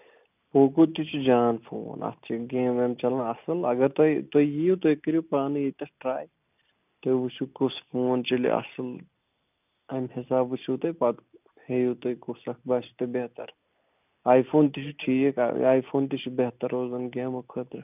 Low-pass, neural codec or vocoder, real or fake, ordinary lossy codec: 3.6 kHz; none; real; none